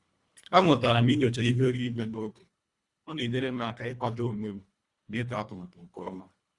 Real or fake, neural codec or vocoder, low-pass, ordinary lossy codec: fake; codec, 24 kHz, 1.5 kbps, HILCodec; 10.8 kHz; Opus, 64 kbps